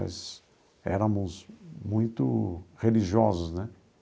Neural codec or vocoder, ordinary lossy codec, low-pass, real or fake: none; none; none; real